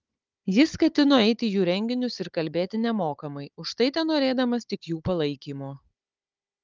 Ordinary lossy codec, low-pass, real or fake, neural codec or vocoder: Opus, 24 kbps; 7.2 kHz; fake; codec, 16 kHz, 16 kbps, FunCodec, trained on Chinese and English, 50 frames a second